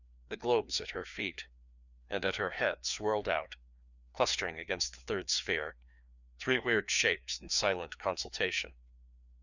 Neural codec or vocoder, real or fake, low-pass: codec, 16 kHz, 2 kbps, FreqCodec, larger model; fake; 7.2 kHz